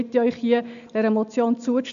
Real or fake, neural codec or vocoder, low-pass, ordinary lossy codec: real; none; 7.2 kHz; none